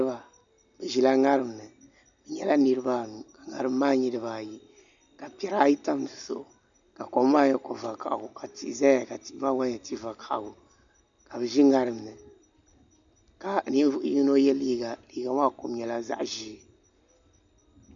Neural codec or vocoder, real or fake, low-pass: none; real; 7.2 kHz